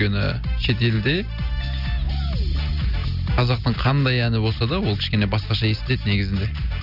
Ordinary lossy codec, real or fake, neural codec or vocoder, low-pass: none; real; none; 5.4 kHz